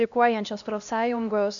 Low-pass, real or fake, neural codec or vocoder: 7.2 kHz; fake; codec, 16 kHz, 1 kbps, X-Codec, HuBERT features, trained on LibriSpeech